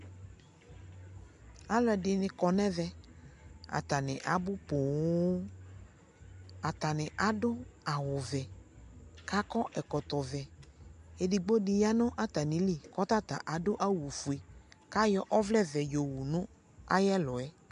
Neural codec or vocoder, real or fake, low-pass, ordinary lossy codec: none; real; 10.8 kHz; MP3, 64 kbps